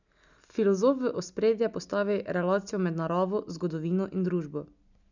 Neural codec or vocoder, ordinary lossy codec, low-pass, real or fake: none; none; 7.2 kHz; real